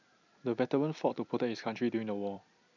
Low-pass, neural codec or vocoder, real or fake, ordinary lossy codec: 7.2 kHz; vocoder, 44.1 kHz, 128 mel bands every 256 samples, BigVGAN v2; fake; none